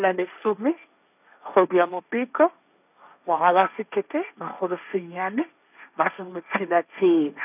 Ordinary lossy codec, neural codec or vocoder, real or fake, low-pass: none; codec, 16 kHz, 1.1 kbps, Voila-Tokenizer; fake; 3.6 kHz